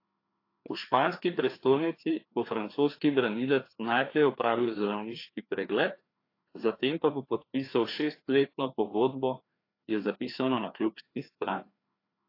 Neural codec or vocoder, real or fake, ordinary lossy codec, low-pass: codec, 16 kHz, 2 kbps, FreqCodec, larger model; fake; AAC, 32 kbps; 5.4 kHz